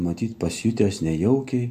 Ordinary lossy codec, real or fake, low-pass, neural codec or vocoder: MP3, 64 kbps; real; 14.4 kHz; none